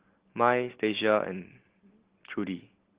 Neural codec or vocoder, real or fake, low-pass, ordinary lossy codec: none; real; 3.6 kHz; Opus, 16 kbps